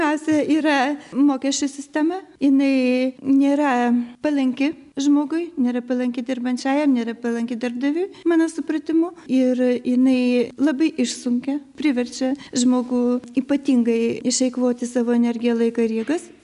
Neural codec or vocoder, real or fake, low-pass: none; real; 10.8 kHz